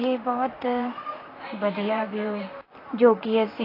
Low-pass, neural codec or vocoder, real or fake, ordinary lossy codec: 5.4 kHz; vocoder, 44.1 kHz, 128 mel bands, Pupu-Vocoder; fake; AAC, 24 kbps